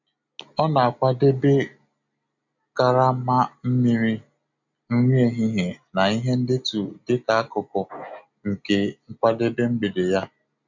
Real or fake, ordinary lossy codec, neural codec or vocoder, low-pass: real; none; none; 7.2 kHz